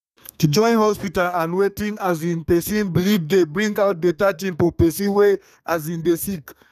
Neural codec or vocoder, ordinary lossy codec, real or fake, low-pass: codec, 32 kHz, 1.9 kbps, SNAC; none; fake; 14.4 kHz